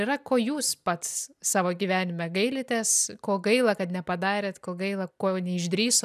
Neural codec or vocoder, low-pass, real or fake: none; 14.4 kHz; real